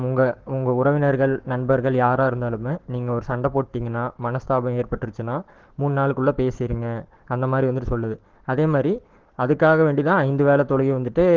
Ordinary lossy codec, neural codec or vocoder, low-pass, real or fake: Opus, 16 kbps; codec, 44.1 kHz, 7.8 kbps, Pupu-Codec; 7.2 kHz; fake